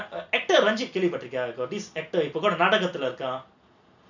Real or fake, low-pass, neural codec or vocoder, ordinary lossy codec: real; 7.2 kHz; none; none